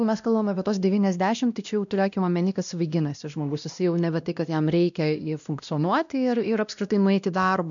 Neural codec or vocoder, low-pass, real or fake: codec, 16 kHz, 1 kbps, X-Codec, WavLM features, trained on Multilingual LibriSpeech; 7.2 kHz; fake